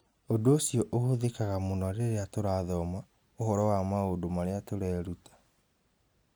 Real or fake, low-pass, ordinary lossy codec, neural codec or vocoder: real; none; none; none